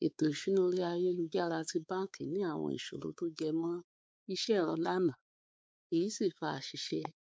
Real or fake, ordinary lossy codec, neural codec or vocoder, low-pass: fake; none; codec, 16 kHz, 4 kbps, X-Codec, WavLM features, trained on Multilingual LibriSpeech; none